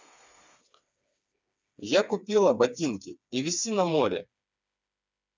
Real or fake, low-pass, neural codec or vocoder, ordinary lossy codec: fake; none; codec, 16 kHz, 4 kbps, FreqCodec, smaller model; none